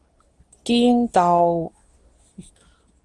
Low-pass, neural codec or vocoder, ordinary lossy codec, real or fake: 10.8 kHz; codec, 24 kHz, 0.9 kbps, WavTokenizer, medium speech release version 2; Opus, 24 kbps; fake